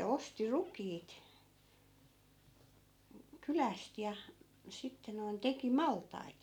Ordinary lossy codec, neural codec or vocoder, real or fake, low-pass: none; none; real; 19.8 kHz